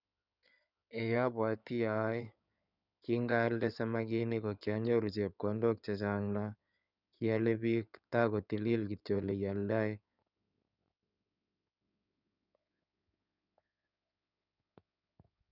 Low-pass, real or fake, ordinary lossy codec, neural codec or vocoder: 5.4 kHz; fake; none; codec, 16 kHz in and 24 kHz out, 2.2 kbps, FireRedTTS-2 codec